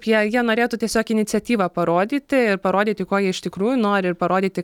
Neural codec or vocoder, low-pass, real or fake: none; 19.8 kHz; real